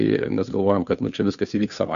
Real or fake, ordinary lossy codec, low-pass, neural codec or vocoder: fake; MP3, 96 kbps; 7.2 kHz; codec, 16 kHz, 2 kbps, FunCodec, trained on Chinese and English, 25 frames a second